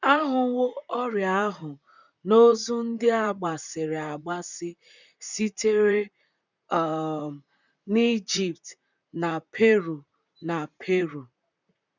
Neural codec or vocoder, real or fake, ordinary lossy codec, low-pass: vocoder, 44.1 kHz, 128 mel bands, Pupu-Vocoder; fake; none; 7.2 kHz